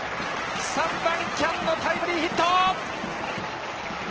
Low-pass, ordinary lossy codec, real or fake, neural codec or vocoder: 7.2 kHz; Opus, 16 kbps; real; none